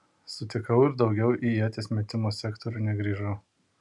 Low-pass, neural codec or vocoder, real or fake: 10.8 kHz; none; real